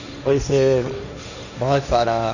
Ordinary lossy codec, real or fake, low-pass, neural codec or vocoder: none; fake; 7.2 kHz; codec, 16 kHz, 1.1 kbps, Voila-Tokenizer